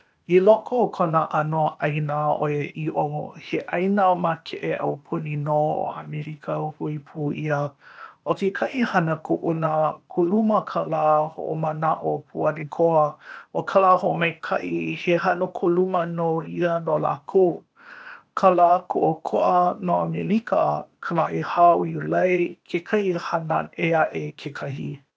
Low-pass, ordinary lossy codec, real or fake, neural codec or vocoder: none; none; fake; codec, 16 kHz, 0.8 kbps, ZipCodec